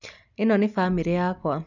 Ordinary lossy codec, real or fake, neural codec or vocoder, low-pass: none; real; none; 7.2 kHz